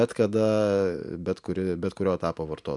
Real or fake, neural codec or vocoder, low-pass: real; none; 10.8 kHz